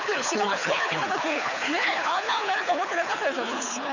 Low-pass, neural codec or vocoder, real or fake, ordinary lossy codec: 7.2 kHz; codec, 24 kHz, 6 kbps, HILCodec; fake; none